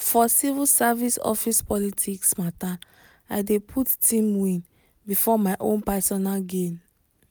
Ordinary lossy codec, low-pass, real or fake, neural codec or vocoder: none; none; real; none